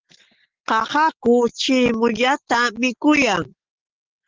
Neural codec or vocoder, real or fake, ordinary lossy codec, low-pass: none; real; Opus, 16 kbps; 7.2 kHz